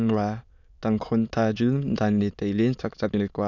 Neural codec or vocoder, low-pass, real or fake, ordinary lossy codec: autoencoder, 22.05 kHz, a latent of 192 numbers a frame, VITS, trained on many speakers; 7.2 kHz; fake; none